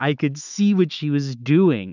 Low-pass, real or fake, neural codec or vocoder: 7.2 kHz; fake; autoencoder, 48 kHz, 128 numbers a frame, DAC-VAE, trained on Japanese speech